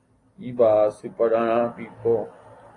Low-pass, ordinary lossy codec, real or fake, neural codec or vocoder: 10.8 kHz; AAC, 32 kbps; real; none